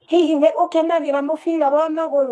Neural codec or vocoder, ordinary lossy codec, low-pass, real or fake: codec, 24 kHz, 0.9 kbps, WavTokenizer, medium music audio release; none; none; fake